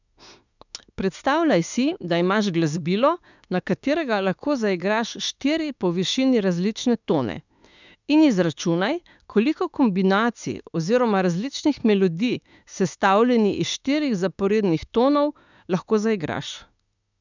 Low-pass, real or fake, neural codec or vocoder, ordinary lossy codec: 7.2 kHz; fake; autoencoder, 48 kHz, 32 numbers a frame, DAC-VAE, trained on Japanese speech; none